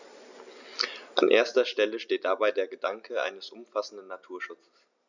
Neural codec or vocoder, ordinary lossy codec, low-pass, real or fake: none; none; 7.2 kHz; real